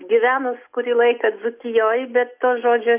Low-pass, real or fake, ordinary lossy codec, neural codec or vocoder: 3.6 kHz; real; MP3, 32 kbps; none